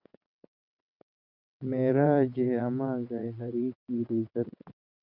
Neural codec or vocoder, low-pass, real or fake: vocoder, 22.05 kHz, 80 mel bands, WaveNeXt; 5.4 kHz; fake